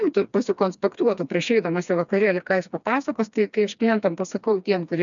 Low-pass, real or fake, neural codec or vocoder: 7.2 kHz; fake; codec, 16 kHz, 2 kbps, FreqCodec, smaller model